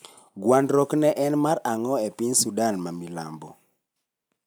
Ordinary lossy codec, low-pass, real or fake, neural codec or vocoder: none; none; real; none